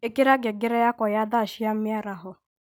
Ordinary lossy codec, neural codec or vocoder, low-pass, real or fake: none; none; 19.8 kHz; real